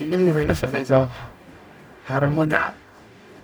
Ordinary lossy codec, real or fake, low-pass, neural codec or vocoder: none; fake; none; codec, 44.1 kHz, 0.9 kbps, DAC